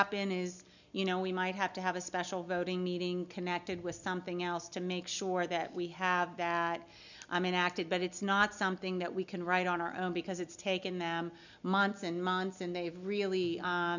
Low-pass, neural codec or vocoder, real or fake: 7.2 kHz; none; real